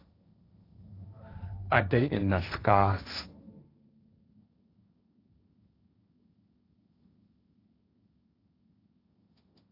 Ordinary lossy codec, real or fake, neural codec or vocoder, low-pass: AAC, 32 kbps; fake; codec, 16 kHz, 1.1 kbps, Voila-Tokenizer; 5.4 kHz